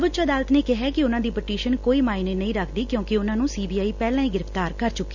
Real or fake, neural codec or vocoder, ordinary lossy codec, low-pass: real; none; none; 7.2 kHz